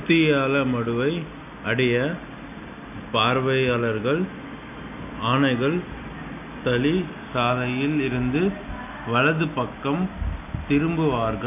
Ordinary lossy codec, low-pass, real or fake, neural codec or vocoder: none; 3.6 kHz; real; none